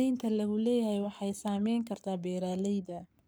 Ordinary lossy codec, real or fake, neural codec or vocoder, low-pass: none; fake; codec, 44.1 kHz, 7.8 kbps, Pupu-Codec; none